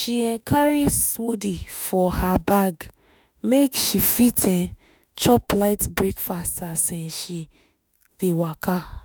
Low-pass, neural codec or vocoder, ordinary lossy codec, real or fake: none; autoencoder, 48 kHz, 32 numbers a frame, DAC-VAE, trained on Japanese speech; none; fake